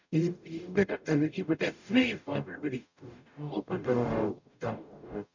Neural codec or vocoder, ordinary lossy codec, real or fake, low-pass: codec, 44.1 kHz, 0.9 kbps, DAC; none; fake; 7.2 kHz